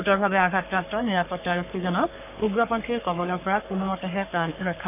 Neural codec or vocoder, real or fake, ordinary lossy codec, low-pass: codec, 44.1 kHz, 3.4 kbps, Pupu-Codec; fake; none; 3.6 kHz